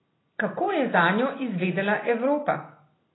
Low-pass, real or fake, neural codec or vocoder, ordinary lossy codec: 7.2 kHz; real; none; AAC, 16 kbps